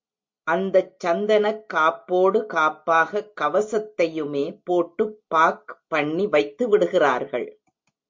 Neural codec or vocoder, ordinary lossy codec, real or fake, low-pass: none; MP3, 48 kbps; real; 7.2 kHz